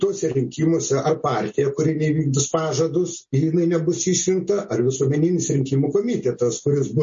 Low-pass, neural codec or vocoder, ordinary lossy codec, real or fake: 7.2 kHz; none; MP3, 32 kbps; real